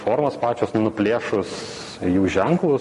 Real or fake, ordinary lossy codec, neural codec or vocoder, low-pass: real; MP3, 48 kbps; none; 14.4 kHz